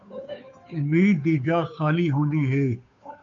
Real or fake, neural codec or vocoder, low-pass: fake; codec, 16 kHz, 2 kbps, FunCodec, trained on Chinese and English, 25 frames a second; 7.2 kHz